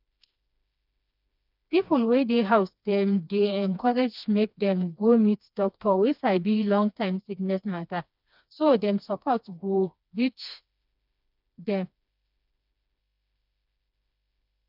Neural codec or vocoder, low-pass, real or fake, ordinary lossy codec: codec, 16 kHz, 2 kbps, FreqCodec, smaller model; 5.4 kHz; fake; none